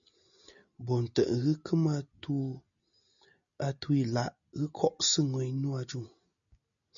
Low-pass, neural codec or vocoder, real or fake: 7.2 kHz; none; real